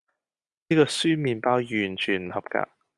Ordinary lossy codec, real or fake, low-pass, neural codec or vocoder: Opus, 64 kbps; real; 10.8 kHz; none